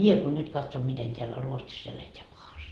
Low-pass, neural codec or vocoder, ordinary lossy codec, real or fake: 10.8 kHz; vocoder, 24 kHz, 100 mel bands, Vocos; Opus, 16 kbps; fake